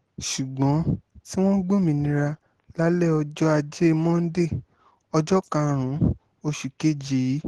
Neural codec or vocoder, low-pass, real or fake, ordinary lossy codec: none; 10.8 kHz; real; Opus, 16 kbps